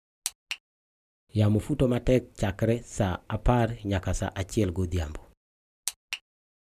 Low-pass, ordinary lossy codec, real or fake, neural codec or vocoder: 14.4 kHz; none; real; none